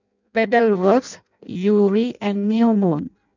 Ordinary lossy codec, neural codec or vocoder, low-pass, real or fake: none; codec, 16 kHz in and 24 kHz out, 0.6 kbps, FireRedTTS-2 codec; 7.2 kHz; fake